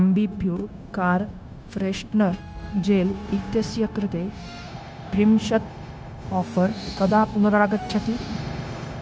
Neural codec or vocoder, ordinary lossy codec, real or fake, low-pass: codec, 16 kHz, 0.9 kbps, LongCat-Audio-Codec; none; fake; none